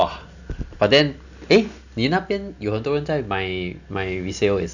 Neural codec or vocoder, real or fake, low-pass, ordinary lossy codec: none; real; 7.2 kHz; none